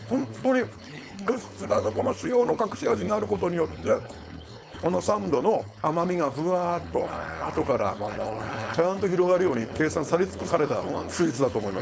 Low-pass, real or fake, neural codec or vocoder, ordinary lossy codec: none; fake; codec, 16 kHz, 4.8 kbps, FACodec; none